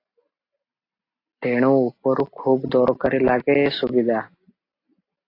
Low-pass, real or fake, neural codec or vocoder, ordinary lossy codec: 5.4 kHz; real; none; AAC, 32 kbps